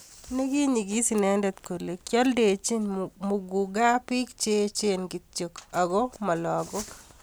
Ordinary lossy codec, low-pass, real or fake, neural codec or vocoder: none; none; real; none